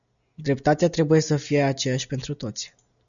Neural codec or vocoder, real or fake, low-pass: none; real; 7.2 kHz